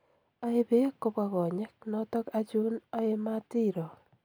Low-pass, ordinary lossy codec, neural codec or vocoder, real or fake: none; none; none; real